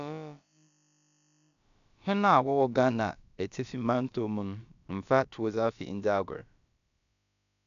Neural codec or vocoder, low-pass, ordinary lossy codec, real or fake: codec, 16 kHz, about 1 kbps, DyCAST, with the encoder's durations; 7.2 kHz; none; fake